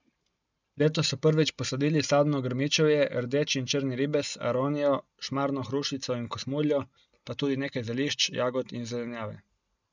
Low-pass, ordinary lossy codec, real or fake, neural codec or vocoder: 7.2 kHz; none; real; none